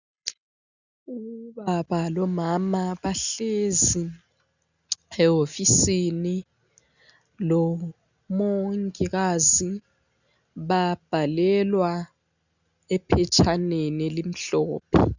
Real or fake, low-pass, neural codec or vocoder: real; 7.2 kHz; none